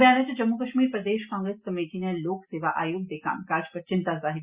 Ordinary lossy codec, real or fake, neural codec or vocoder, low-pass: Opus, 64 kbps; real; none; 3.6 kHz